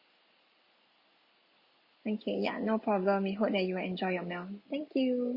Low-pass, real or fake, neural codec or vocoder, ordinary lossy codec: 5.4 kHz; real; none; Opus, 64 kbps